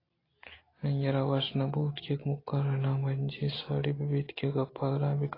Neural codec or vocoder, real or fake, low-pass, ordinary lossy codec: none; real; 5.4 kHz; AAC, 24 kbps